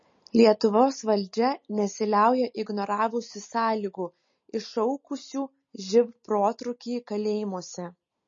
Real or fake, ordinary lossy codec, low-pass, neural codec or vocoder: real; MP3, 32 kbps; 7.2 kHz; none